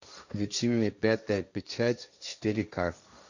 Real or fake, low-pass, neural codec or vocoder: fake; 7.2 kHz; codec, 16 kHz, 1.1 kbps, Voila-Tokenizer